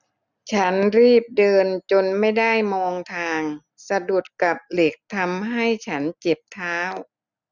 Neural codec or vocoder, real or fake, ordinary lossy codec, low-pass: none; real; none; 7.2 kHz